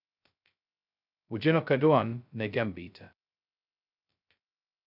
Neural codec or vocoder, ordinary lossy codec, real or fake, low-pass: codec, 16 kHz, 0.2 kbps, FocalCodec; none; fake; 5.4 kHz